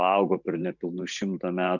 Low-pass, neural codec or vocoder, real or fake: 7.2 kHz; vocoder, 44.1 kHz, 128 mel bands every 512 samples, BigVGAN v2; fake